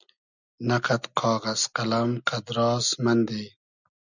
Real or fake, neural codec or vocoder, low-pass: real; none; 7.2 kHz